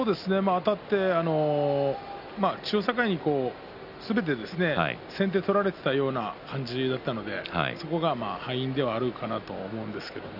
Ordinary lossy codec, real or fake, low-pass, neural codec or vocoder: none; real; 5.4 kHz; none